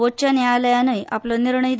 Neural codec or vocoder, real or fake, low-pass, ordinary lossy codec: none; real; none; none